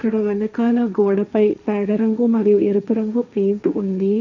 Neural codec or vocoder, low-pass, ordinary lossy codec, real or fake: codec, 16 kHz, 1.1 kbps, Voila-Tokenizer; 7.2 kHz; none; fake